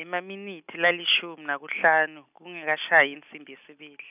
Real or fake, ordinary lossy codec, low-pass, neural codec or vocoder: real; none; 3.6 kHz; none